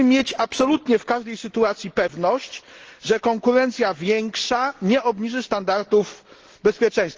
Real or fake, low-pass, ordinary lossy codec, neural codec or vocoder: real; 7.2 kHz; Opus, 16 kbps; none